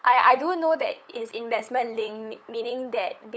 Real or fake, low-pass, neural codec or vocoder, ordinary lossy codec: fake; none; codec, 16 kHz, 8 kbps, FunCodec, trained on LibriTTS, 25 frames a second; none